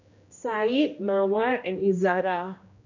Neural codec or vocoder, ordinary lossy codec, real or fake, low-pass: codec, 16 kHz, 1 kbps, X-Codec, HuBERT features, trained on general audio; none; fake; 7.2 kHz